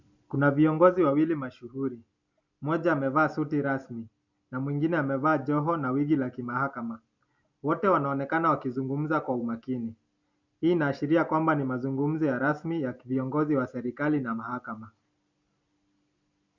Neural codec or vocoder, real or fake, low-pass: none; real; 7.2 kHz